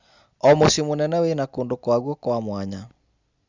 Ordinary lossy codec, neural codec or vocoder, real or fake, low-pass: Opus, 64 kbps; none; real; 7.2 kHz